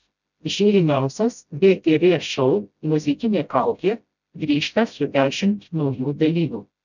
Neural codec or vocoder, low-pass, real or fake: codec, 16 kHz, 0.5 kbps, FreqCodec, smaller model; 7.2 kHz; fake